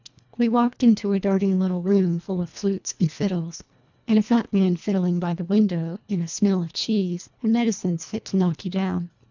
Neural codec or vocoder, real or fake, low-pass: codec, 24 kHz, 1.5 kbps, HILCodec; fake; 7.2 kHz